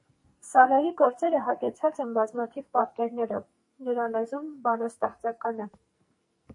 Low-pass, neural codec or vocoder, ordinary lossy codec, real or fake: 10.8 kHz; codec, 44.1 kHz, 2.6 kbps, SNAC; MP3, 48 kbps; fake